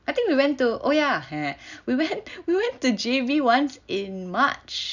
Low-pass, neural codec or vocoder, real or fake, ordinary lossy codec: 7.2 kHz; none; real; none